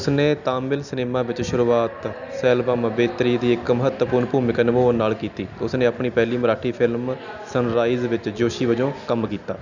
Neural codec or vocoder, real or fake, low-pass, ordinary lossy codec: none; real; 7.2 kHz; none